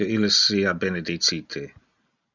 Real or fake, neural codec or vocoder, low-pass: real; none; 7.2 kHz